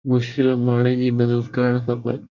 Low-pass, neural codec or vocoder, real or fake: 7.2 kHz; codec, 24 kHz, 1 kbps, SNAC; fake